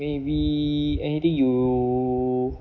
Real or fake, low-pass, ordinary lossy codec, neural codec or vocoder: real; 7.2 kHz; none; none